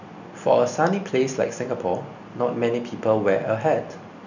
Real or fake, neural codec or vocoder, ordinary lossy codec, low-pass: real; none; none; 7.2 kHz